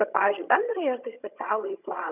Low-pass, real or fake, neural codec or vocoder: 3.6 kHz; fake; vocoder, 22.05 kHz, 80 mel bands, HiFi-GAN